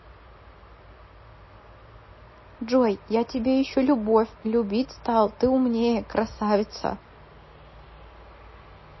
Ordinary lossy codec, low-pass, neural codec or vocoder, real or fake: MP3, 24 kbps; 7.2 kHz; none; real